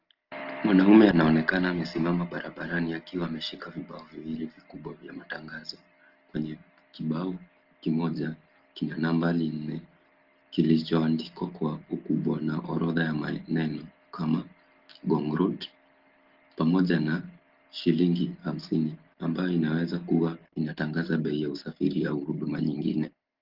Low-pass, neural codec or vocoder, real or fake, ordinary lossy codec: 5.4 kHz; none; real; Opus, 16 kbps